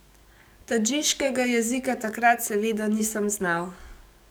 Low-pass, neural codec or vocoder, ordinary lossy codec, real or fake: none; codec, 44.1 kHz, 7.8 kbps, DAC; none; fake